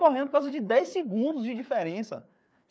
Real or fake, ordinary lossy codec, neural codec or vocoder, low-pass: fake; none; codec, 16 kHz, 4 kbps, FreqCodec, larger model; none